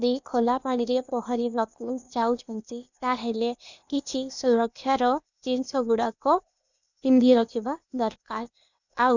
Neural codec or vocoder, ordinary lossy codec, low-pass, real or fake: codec, 16 kHz, 0.8 kbps, ZipCodec; none; 7.2 kHz; fake